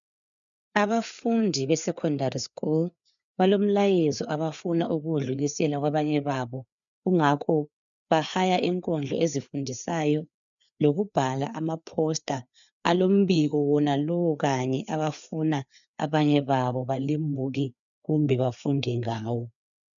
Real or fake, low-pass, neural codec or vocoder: fake; 7.2 kHz; codec, 16 kHz, 4 kbps, FreqCodec, larger model